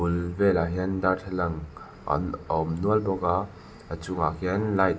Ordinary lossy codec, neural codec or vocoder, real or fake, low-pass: none; none; real; none